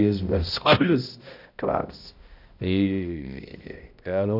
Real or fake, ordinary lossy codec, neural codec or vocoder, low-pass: fake; none; codec, 16 kHz, 0.5 kbps, X-Codec, HuBERT features, trained on balanced general audio; 5.4 kHz